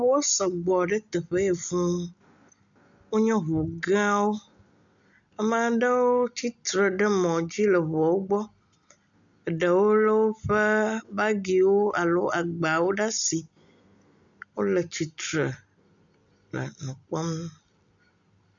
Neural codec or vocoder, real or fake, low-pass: none; real; 7.2 kHz